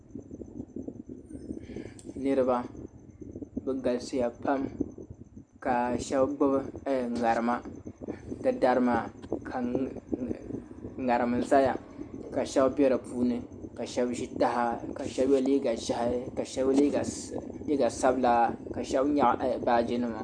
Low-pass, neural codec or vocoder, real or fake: 9.9 kHz; none; real